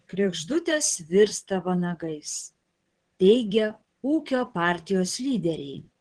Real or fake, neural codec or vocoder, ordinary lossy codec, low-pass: fake; vocoder, 22.05 kHz, 80 mel bands, WaveNeXt; Opus, 16 kbps; 9.9 kHz